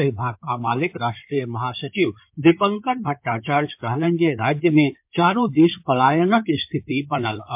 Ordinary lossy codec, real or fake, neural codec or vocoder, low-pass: MP3, 32 kbps; fake; codec, 16 kHz in and 24 kHz out, 2.2 kbps, FireRedTTS-2 codec; 3.6 kHz